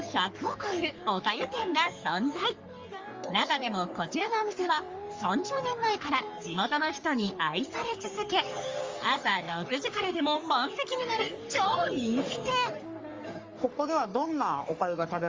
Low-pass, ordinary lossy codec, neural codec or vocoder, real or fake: 7.2 kHz; Opus, 32 kbps; codec, 44.1 kHz, 3.4 kbps, Pupu-Codec; fake